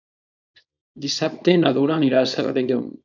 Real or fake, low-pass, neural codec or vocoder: fake; 7.2 kHz; codec, 24 kHz, 0.9 kbps, WavTokenizer, small release